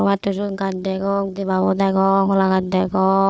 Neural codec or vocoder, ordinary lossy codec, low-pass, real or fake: codec, 16 kHz, 4 kbps, FunCodec, trained on Chinese and English, 50 frames a second; none; none; fake